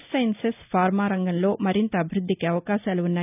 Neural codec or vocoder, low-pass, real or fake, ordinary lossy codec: none; 3.6 kHz; real; none